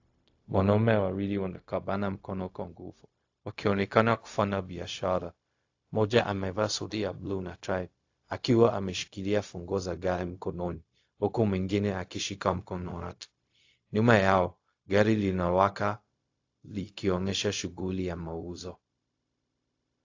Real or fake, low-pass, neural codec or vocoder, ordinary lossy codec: fake; 7.2 kHz; codec, 16 kHz, 0.4 kbps, LongCat-Audio-Codec; AAC, 48 kbps